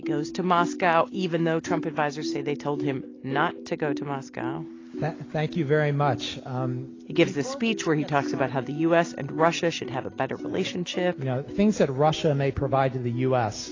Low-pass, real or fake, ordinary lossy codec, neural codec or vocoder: 7.2 kHz; real; AAC, 32 kbps; none